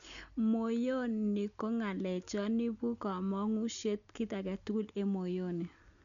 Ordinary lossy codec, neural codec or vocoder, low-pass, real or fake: none; none; 7.2 kHz; real